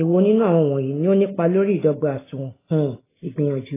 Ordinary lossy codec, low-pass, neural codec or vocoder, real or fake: AAC, 16 kbps; 3.6 kHz; none; real